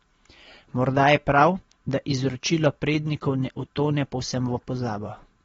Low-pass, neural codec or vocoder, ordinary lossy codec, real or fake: 19.8 kHz; none; AAC, 24 kbps; real